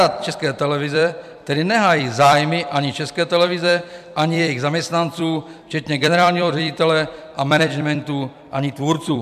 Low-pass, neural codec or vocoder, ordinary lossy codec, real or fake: 14.4 kHz; vocoder, 44.1 kHz, 128 mel bands every 256 samples, BigVGAN v2; MP3, 96 kbps; fake